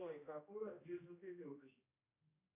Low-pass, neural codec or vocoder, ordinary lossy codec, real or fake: 3.6 kHz; codec, 16 kHz, 1 kbps, X-Codec, HuBERT features, trained on general audio; AAC, 24 kbps; fake